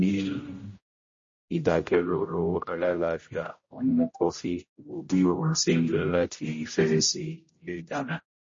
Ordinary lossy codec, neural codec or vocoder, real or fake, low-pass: MP3, 32 kbps; codec, 16 kHz, 0.5 kbps, X-Codec, HuBERT features, trained on general audio; fake; 7.2 kHz